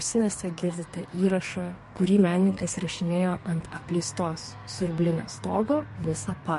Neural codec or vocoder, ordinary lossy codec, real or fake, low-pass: codec, 32 kHz, 1.9 kbps, SNAC; MP3, 48 kbps; fake; 14.4 kHz